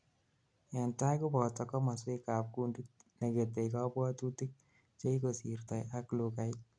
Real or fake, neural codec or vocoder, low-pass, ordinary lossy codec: real; none; 9.9 kHz; none